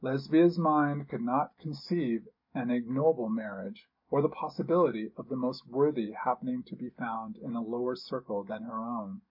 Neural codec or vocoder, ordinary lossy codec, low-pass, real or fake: none; MP3, 32 kbps; 5.4 kHz; real